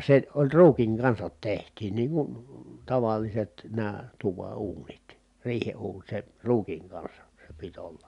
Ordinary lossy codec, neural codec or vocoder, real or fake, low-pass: none; none; real; 10.8 kHz